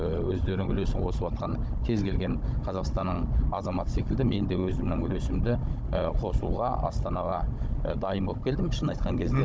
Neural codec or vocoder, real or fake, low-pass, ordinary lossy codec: codec, 16 kHz, 16 kbps, FunCodec, trained on Chinese and English, 50 frames a second; fake; none; none